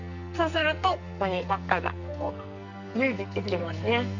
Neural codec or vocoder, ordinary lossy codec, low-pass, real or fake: codec, 44.1 kHz, 2.6 kbps, SNAC; none; 7.2 kHz; fake